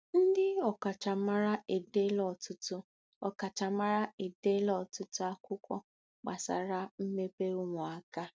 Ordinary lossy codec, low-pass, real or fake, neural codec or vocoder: none; none; real; none